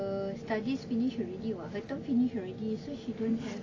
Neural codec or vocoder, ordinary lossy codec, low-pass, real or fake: none; AAC, 32 kbps; 7.2 kHz; real